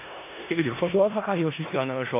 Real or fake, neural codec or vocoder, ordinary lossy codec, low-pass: fake; codec, 16 kHz in and 24 kHz out, 0.4 kbps, LongCat-Audio-Codec, four codebook decoder; AAC, 24 kbps; 3.6 kHz